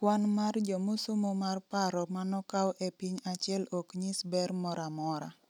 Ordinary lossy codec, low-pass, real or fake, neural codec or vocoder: none; 19.8 kHz; real; none